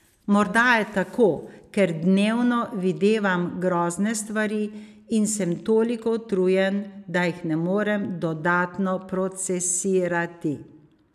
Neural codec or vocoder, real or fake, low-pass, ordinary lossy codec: none; real; 14.4 kHz; none